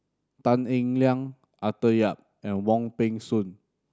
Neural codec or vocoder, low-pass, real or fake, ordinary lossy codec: none; none; real; none